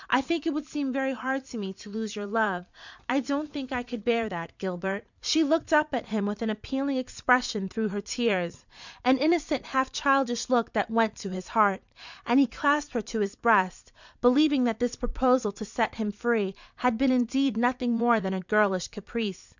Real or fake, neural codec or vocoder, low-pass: fake; vocoder, 44.1 kHz, 80 mel bands, Vocos; 7.2 kHz